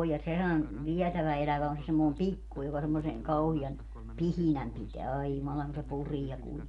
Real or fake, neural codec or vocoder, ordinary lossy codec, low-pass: real; none; MP3, 96 kbps; 10.8 kHz